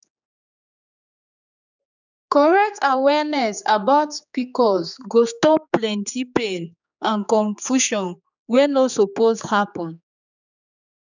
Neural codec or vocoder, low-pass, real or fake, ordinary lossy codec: codec, 16 kHz, 4 kbps, X-Codec, HuBERT features, trained on general audio; 7.2 kHz; fake; none